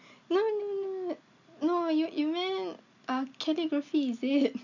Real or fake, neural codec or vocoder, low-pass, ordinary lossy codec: real; none; 7.2 kHz; none